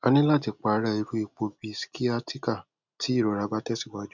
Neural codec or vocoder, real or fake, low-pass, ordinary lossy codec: none; real; 7.2 kHz; none